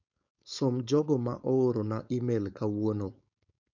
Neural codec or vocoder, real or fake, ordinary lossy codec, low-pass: codec, 16 kHz, 4.8 kbps, FACodec; fake; none; 7.2 kHz